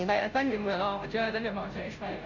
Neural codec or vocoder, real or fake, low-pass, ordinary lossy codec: codec, 16 kHz, 0.5 kbps, FunCodec, trained on Chinese and English, 25 frames a second; fake; 7.2 kHz; none